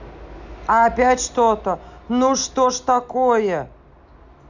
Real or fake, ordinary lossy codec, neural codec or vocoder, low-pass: real; none; none; 7.2 kHz